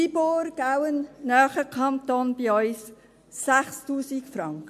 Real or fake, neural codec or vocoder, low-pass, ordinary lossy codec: real; none; 14.4 kHz; MP3, 96 kbps